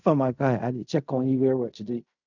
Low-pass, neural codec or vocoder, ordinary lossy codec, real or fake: 7.2 kHz; codec, 16 kHz in and 24 kHz out, 0.4 kbps, LongCat-Audio-Codec, fine tuned four codebook decoder; none; fake